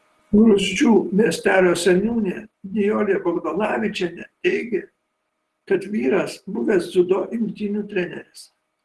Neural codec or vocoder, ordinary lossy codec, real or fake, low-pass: none; Opus, 16 kbps; real; 10.8 kHz